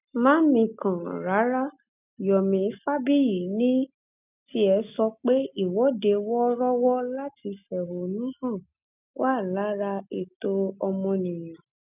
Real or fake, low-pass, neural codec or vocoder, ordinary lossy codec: real; 3.6 kHz; none; none